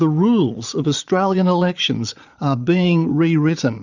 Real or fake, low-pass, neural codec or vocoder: fake; 7.2 kHz; vocoder, 22.05 kHz, 80 mel bands, Vocos